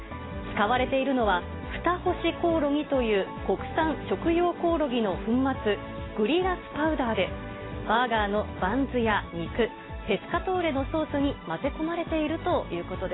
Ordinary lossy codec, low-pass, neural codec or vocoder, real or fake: AAC, 16 kbps; 7.2 kHz; none; real